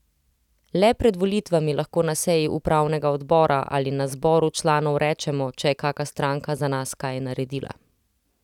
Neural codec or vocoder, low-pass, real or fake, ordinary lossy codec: none; 19.8 kHz; real; none